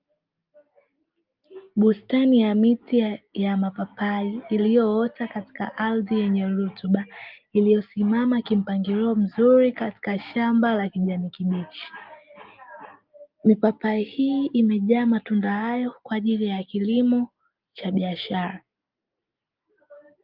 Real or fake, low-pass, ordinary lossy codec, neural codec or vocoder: real; 5.4 kHz; Opus, 32 kbps; none